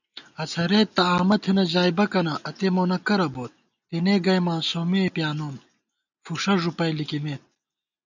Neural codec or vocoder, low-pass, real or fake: none; 7.2 kHz; real